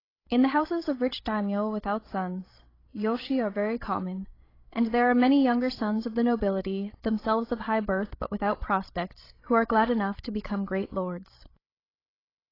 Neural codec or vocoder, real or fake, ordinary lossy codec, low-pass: codec, 16 kHz, 16 kbps, FreqCodec, larger model; fake; AAC, 24 kbps; 5.4 kHz